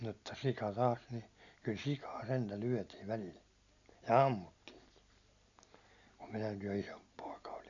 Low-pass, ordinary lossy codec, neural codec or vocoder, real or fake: 7.2 kHz; none; none; real